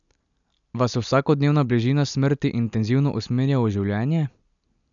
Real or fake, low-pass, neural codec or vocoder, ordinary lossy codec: real; 7.2 kHz; none; none